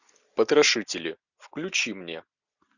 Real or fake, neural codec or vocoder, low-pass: real; none; 7.2 kHz